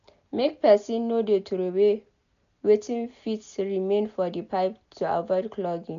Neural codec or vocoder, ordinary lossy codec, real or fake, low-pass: none; none; real; 7.2 kHz